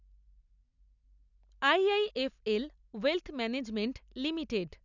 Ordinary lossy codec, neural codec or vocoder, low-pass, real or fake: none; none; 7.2 kHz; real